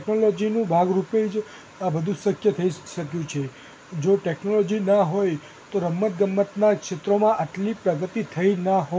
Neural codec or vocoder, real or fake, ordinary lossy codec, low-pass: none; real; none; none